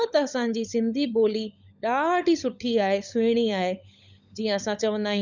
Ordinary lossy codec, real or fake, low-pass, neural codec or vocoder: none; fake; 7.2 kHz; codec, 16 kHz, 16 kbps, FunCodec, trained on LibriTTS, 50 frames a second